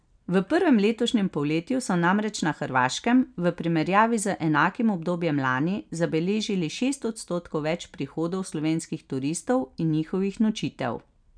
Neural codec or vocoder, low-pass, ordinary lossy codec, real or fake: none; 9.9 kHz; none; real